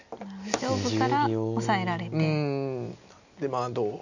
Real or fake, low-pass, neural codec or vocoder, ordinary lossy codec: real; 7.2 kHz; none; none